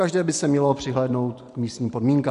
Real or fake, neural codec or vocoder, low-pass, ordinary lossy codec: real; none; 10.8 kHz; MP3, 64 kbps